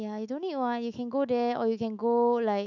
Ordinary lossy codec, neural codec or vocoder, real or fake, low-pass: none; autoencoder, 48 kHz, 128 numbers a frame, DAC-VAE, trained on Japanese speech; fake; 7.2 kHz